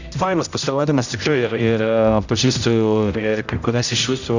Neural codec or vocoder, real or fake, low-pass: codec, 16 kHz, 0.5 kbps, X-Codec, HuBERT features, trained on general audio; fake; 7.2 kHz